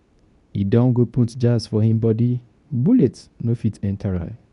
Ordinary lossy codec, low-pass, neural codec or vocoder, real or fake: none; 10.8 kHz; codec, 24 kHz, 0.9 kbps, WavTokenizer, medium speech release version 2; fake